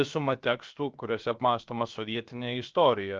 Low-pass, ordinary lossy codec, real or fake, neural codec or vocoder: 7.2 kHz; Opus, 24 kbps; fake; codec, 16 kHz, about 1 kbps, DyCAST, with the encoder's durations